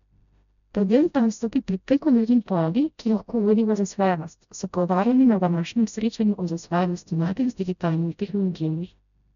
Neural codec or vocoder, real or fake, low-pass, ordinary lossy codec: codec, 16 kHz, 0.5 kbps, FreqCodec, smaller model; fake; 7.2 kHz; none